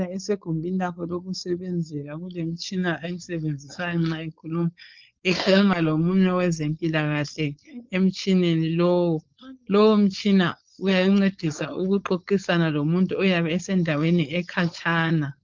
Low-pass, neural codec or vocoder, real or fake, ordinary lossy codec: 7.2 kHz; codec, 16 kHz, 4.8 kbps, FACodec; fake; Opus, 24 kbps